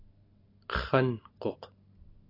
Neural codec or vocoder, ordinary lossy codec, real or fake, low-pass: none; AAC, 32 kbps; real; 5.4 kHz